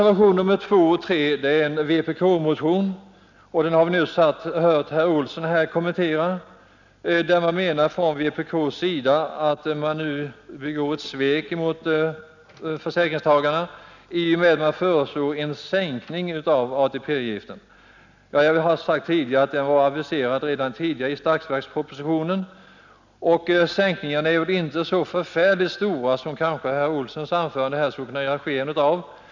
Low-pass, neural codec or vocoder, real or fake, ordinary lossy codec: 7.2 kHz; none; real; none